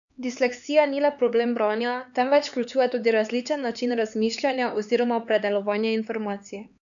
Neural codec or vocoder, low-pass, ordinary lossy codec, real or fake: codec, 16 kHz, 4 kbps, X-Codec, HuBERT features, trained on LibriSpeech; 7.2 kHz; none; fake